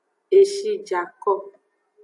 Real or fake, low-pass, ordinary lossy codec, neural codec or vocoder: real; 10.8 kHz; AAC, 64 kbps; none